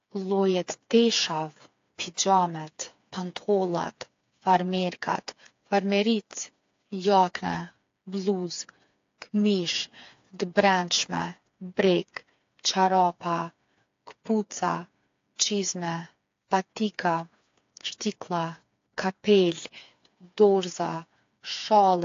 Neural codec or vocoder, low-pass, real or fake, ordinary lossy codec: codec, 16 kHz, 4 kbps, FreqCodec, smaller model; 7.2 kHz; fake; AAC, 48 kbps